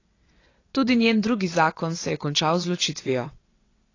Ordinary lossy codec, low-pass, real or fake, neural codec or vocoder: AAC, 32 kbps; 7.2 kHz; fake; vocoder, 22.05 kHz, 80 mel bands, WaveNeXt